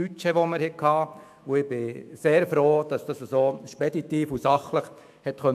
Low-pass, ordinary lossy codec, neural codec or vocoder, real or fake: 14.4 kHz; AAC, 96 kbps; autoencoder, 48 kHz, 128 numbers a frame, DAC-VAE, trained on Japanese speech; fake